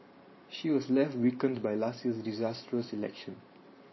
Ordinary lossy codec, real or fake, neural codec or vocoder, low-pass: MP3, 24 kbps; fake; vocoder, 44.1 kHz, 128 mel bands every 512 samples, BigVGAN v2; 7.2 kHz